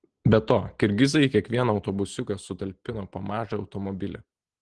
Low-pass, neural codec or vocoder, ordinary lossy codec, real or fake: 9.9 kHz; none; Opus, 16 kbps; real